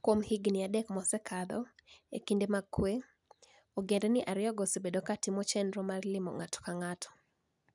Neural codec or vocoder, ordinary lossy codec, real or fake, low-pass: none; none; real; 10.8 kHz